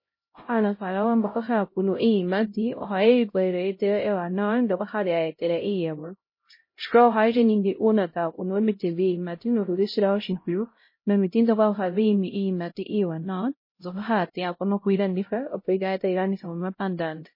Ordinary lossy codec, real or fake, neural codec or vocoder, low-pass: MP3, 24 kbps; fake; codec, 16 kHz, 0.5 kbps, X-Codec, HuBERT features, trained on LibriSpeech; 5.4 kHz